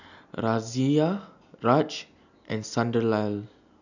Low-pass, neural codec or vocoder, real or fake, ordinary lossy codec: 7.2 kHz; none; real; none